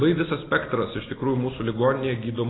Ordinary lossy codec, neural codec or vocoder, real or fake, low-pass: AAC, 16 kbps; none; real; 7.2 kHz